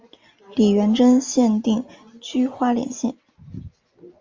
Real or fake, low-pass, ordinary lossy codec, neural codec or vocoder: real; 7.2 kHz; Opus, 32 kbps; none